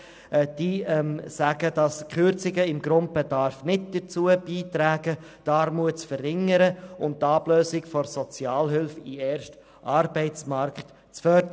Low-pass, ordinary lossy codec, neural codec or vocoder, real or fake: none; none; none; real